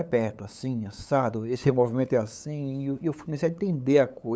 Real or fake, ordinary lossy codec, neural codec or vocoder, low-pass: fake; none; codec, 16 kHz, 8 kbps, FunCodec, trained on LibriTTS, 25 frames a second; none